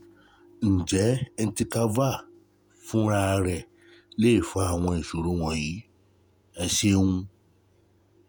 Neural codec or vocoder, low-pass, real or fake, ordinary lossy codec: vocoder, 48 kHz, 128 mel bands, Vocos; none; fake; none